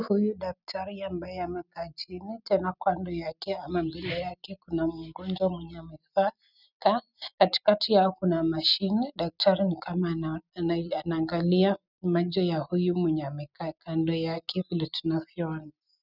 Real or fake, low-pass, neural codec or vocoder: real; 5.4 kHz; none